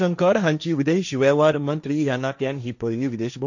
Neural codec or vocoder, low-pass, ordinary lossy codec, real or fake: codec, 16 kHz, 1.1 kbps, Voila-Tokenizer; 7.2 kHz; none; fake